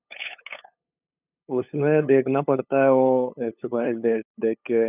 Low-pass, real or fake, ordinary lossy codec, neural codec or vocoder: 3.6 kHz; fake; none; codec, 16 kHz, 8 kbps, FunCodec, trained on LibriTTS, 25 frames a second